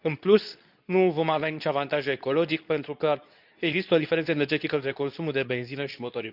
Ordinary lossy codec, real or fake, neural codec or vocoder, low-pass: none; fake; codec, 24 kHz, 0.9 kbps, WavTokenizer, medium speech release version 2; 5.4 kHz